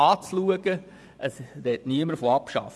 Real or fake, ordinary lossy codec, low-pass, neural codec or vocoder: fake; none; none; vocoder, 24 kHz, 100 mel bands, Vocos